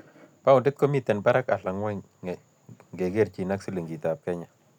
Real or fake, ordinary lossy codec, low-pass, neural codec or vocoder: real; none; 19.8 kHz; none